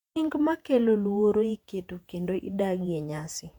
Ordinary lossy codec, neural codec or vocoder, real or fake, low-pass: MP3, 96 kbps; vocoder, 48 kHz, 128 mel bands, Vocos; fake; 19.8 kHz